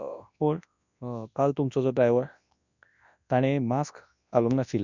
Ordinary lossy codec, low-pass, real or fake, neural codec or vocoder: none; 7.2 kHz; fake; codec, 24 kHz, 0.9 kbps, WavTokenizer, large speech release